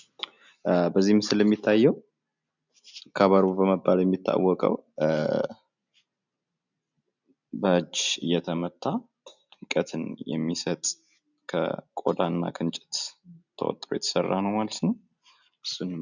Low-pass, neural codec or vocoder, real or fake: 7.2 kHz; none; real